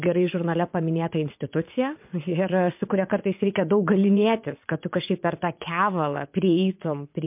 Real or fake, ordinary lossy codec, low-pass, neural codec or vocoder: fake; MP3, 32 kbps; 3.6 kHz; vocoder, 22.05 kHz, 80 mel bands, WaveNeXt